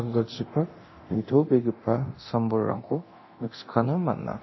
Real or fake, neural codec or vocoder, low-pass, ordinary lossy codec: fake; codec, 24 kHz, 0.9 kbps, DualCodec; 7.2 kHz; MP3, 24 kbps